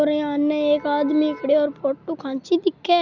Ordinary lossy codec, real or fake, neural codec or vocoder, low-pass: none; real; none; 7.2 kHz